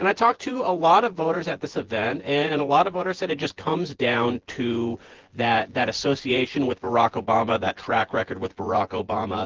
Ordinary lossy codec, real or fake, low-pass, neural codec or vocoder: Opus, 16 kbps; fake; 7.2 kHz; vocoder, 24 kHz, 100 mel bands, Vocos